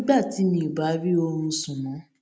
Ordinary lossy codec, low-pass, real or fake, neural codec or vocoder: none; none; real; none